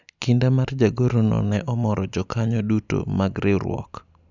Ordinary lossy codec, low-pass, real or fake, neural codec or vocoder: none; 7.2 kHz; real; none